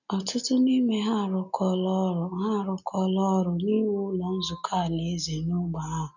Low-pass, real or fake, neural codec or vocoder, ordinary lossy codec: 7.2 kHz; real; none; none